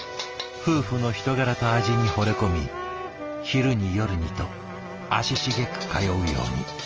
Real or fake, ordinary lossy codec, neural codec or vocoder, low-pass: real; Opus, 24 kbps; none; 7.2 kHz